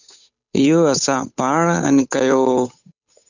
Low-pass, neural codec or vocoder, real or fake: 7.2 kHz; codec, 16 kHz, 8 kbps, FunCodec, trained on Chinese and English, 25 frames a second; fake